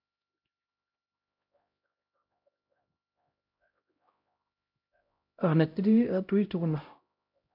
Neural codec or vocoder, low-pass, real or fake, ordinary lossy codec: codec, 16 kHz, 0.5 kbps, X-Codec, HuBERT features, trained on LibriSpeech; 5.4 kHz; fake; MP3, 48 kbps